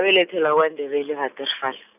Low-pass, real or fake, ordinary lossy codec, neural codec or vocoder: 3.6 kHz; real; none; none